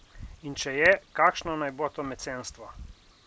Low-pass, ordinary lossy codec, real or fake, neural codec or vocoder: none; none; real; none